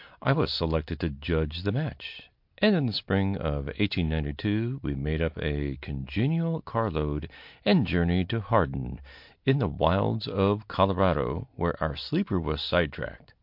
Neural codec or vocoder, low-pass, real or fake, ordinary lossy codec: none; 5.4 kHz; real; MP3, 48 kbps